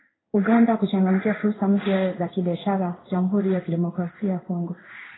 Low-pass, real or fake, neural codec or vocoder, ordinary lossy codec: 7.2 kHz; fake; codec, 16 kHz in and 24 kHz out, 1 kbps, XY-Tokenizer; AAC, 16 kbps